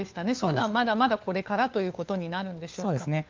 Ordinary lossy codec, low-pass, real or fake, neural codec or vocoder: Opus, 24 kbps; 7.2 kHz; fake; codec, 16 kHz, 4 kbps, FunCodec, trained on LibriTTS, 50 frames a second